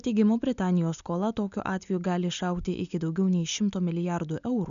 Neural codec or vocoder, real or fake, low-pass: none; real; 7.2 kHz